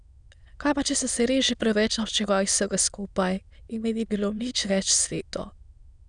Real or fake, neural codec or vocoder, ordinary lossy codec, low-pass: fake; autoencoder, 22.05 kHz, a latent of 192 numbers a frame, VITS, trained on many speakers; none; 9.9 kHz